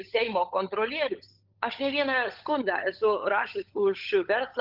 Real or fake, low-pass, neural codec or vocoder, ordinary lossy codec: fake; 5.4 kHz; codec, 16 kHz, 16 kbps, FunCodec, trained on LibriTTS, 50 frames a second; Opus, 24 kbps